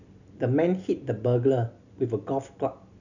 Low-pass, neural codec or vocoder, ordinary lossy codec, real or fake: 7.2 kHz; none; none; real